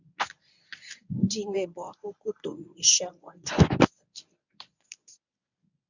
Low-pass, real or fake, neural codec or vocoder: 7.2 kHz; fake; codec, 24 kHz, 0.9 kbps, WavTokenizer, medium speech release version 1